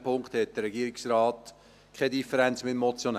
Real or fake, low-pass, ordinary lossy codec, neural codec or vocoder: real; 14.4 kHz; none; none